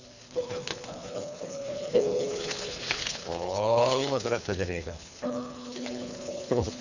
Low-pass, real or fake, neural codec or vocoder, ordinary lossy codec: 7.2 kHz; fake; codec, 24 kHz, 3 kbps, HILCodec; none